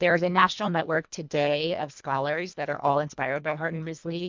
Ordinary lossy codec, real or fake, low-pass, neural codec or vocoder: MP3, 64 kbps; fake; 7.2 kHz; codec, 24 kHz, 1.5 kbps, HILCodec